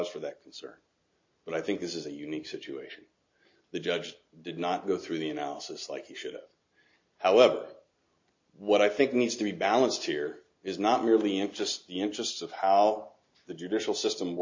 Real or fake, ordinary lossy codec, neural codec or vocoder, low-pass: real; MP3, 32 kbps; none; 7.2 kHz